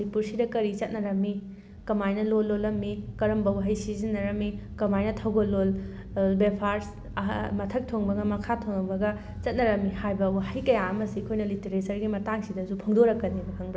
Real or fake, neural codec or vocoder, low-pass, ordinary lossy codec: real; none; none; none